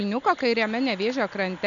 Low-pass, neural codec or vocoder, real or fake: 7.2 kHz; none; real